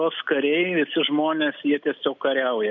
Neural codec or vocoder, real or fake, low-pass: none; real; 7.2 kHz